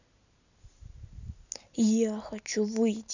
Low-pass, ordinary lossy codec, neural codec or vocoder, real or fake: 7.2 kHz; none; none; real